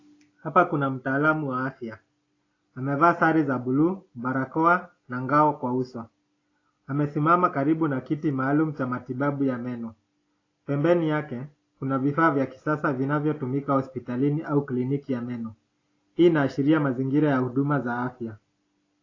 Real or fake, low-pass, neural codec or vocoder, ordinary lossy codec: real; 7.2 kHz; none; AAC, 32 kbps